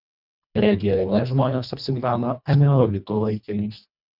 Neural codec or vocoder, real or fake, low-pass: codec, 24 kHz, 1.5 kbps, HILCodec; fake; 5.4 kHz